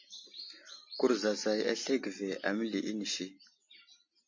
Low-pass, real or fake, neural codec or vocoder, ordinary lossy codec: 7.2 kHz; real; none; MP3, 32 kbps